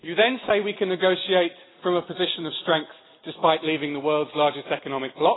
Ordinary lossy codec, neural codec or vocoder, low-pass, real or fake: AAC, 16 kbps; none; 7.2 kHz; real